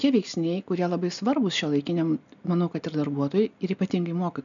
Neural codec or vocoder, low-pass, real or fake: none; 7.2 kHz; real